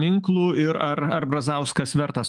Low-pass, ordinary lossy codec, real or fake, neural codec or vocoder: 10.8 kHz; Opus, 32 kbps; fake; autoencoder, 48 kHz, 128 numbers a frame, DAC-VAE, trained on Japanese speech